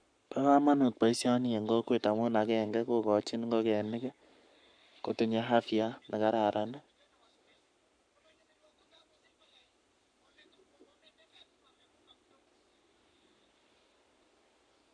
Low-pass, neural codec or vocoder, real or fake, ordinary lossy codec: 9.9 kHz; codec, 44.1 kHz, 7.8 kbps, Pupu-Codec; fake; none